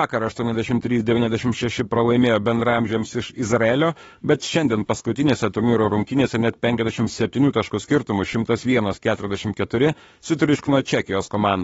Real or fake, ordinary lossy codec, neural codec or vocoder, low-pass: fake; AAC, 24 kbps; autoencoder, 48 kHz, 32 numbers a frame, DAC-VAE, trained on Japanese speech; 19.8 kHz